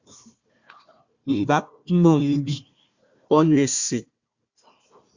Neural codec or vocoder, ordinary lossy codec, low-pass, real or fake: codec, 16 kHz, 1 kbps, FunCodec, trained on Chinese and English, 50 frames a second; Opus, 64 kbps; 7.2 kHz; fake